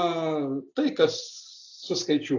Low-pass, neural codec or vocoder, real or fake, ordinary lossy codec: 7.2 kHz; none; real; AAC, 48 kbps